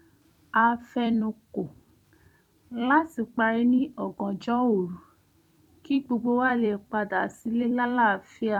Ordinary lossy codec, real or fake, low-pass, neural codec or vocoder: none; fake; 19.8 kHz; vocoder, 44.1 kHz, 128 mel bands every 256 samples, BigVGAN v2